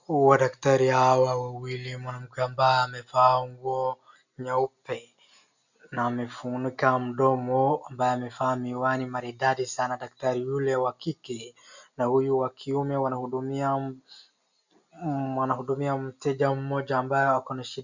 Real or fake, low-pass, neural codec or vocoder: real; 7.2 kHz; none